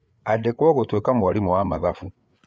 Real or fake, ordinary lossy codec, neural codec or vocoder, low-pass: fake; none; codec, 16 kHz, 8 kbps, FreqCodec, larger model; none